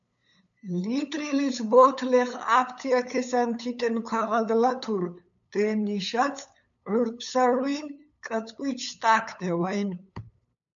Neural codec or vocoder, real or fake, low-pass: codec, 16 kHz, 8 kbps, FunCodec, trained on LibriTTS, 25 frames a second; fake; 7.2 kHz